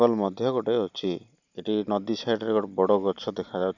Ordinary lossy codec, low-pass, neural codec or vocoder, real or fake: none; 7.2 kHz; none; real